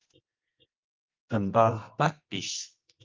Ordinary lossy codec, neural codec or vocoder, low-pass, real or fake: Opus, 24 kbps; codec, 24 kHz, 0.9 kbps, WavTokenizer, medium music audio release; 7.2 kHz; fake